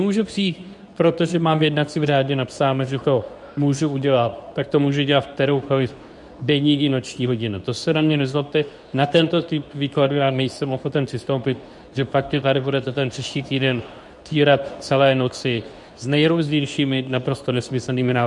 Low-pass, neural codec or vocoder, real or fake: 10.8 kHz; codec, 24 kHz, 0.9 kbps, WavTokenizer, medium speech release version 2; fake